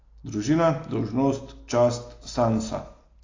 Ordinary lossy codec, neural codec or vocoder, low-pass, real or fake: AAC, 32 kbps; none; 7.2 kHz; real